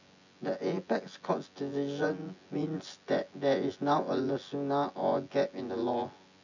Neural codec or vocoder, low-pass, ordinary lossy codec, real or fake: vocoder, 24 kHz, 100 mel bands, Vocos; 7.2 kHz; none; fake